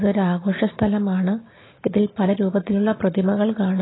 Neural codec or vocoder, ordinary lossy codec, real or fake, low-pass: none; AAC, 16 kbps; real; 7.2 kHz